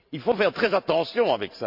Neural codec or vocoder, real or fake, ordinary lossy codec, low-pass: vocoder, 44.1 kHz, 128 mel bands every 512 samples, BigVGAN v2; fake; none; 5.4 kHz